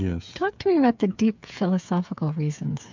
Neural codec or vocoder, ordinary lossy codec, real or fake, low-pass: codec, 16 kHz, 8 kbps, FreqCodec, smaller model; MP3, 64 kbps; fake; 7.2 kHz